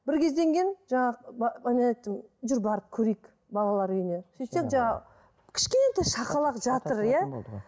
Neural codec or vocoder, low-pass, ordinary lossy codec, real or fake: none; none; none; real